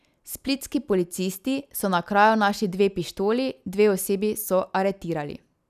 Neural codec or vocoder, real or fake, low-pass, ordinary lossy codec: none; real; 14.4 kHz; none